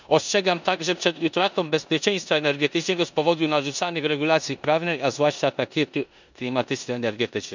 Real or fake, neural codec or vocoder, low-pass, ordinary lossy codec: fake; codec, 16 kHz in and 24 kHz out, 0.9 kbps, LongCat-Audio-Codec, four codebook decoder; 7.2 kHz; none